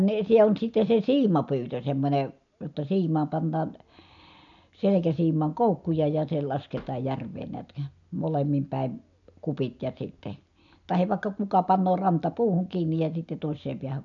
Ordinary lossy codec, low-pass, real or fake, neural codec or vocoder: none; 7.2 kHz; real; none